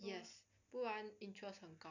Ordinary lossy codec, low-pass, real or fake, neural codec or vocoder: none; 7.2 kHz; real; none